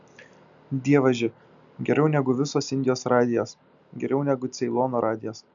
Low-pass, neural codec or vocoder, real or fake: 7.2 kHz; none; real